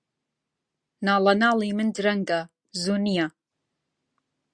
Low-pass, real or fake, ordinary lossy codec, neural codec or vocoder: 9.9 kHz; real; Opus, 64 kbps; none